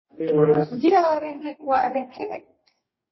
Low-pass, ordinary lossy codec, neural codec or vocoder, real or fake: 7.2 kHz; MP3, 24 kbps; codec, 44.1 kHz, 2.6 kbps, DAC; fake